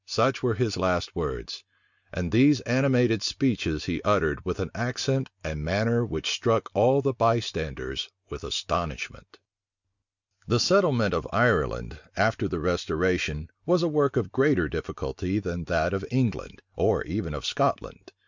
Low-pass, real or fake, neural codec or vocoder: 7.2 kHz; real; none